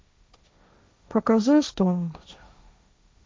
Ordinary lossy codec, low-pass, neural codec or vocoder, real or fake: none; none; codec, 16 kHz, 1.1 kbps, Voila-Tokenizer; fake